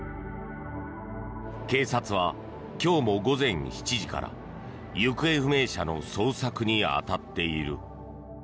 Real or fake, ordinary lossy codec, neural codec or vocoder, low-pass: real; none; none; none